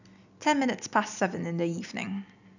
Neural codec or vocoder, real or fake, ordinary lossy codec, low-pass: none; real; none; 7.2 kHz